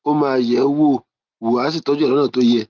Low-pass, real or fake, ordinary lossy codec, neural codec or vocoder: 7.2 kHz; real; Opus, 32 kbps; none